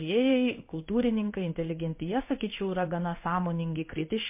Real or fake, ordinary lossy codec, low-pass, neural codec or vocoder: fake; MP3, 24 kbps; 3.6 kHz; codec, 16 kHz in and 24 kHz out, 1 kbps, XY-Tokenizer